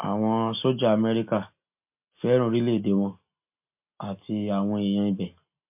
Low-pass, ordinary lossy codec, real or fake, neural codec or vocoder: 3.6 kHz; MP3, 32 kbps; real; none